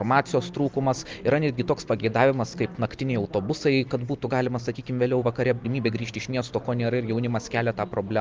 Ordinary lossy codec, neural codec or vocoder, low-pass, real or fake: Opus, 32 kbps; none; 7.2 kHz; real